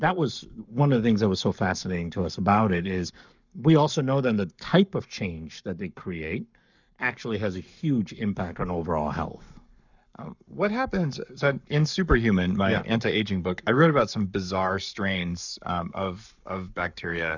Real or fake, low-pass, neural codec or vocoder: fake; 7.2 kHz; codec, 44.1 kHz, 7.8 kbps, Pupu-Codec